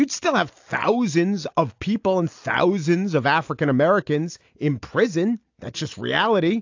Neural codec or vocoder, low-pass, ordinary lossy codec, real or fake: none; 7.2 kHz; AAC, 48 kbps; real